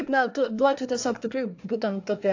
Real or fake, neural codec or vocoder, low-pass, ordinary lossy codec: fake; codec, 24 kHz, 1 kbps, SNAC; 7.2 kHz; AAC, 48 kbps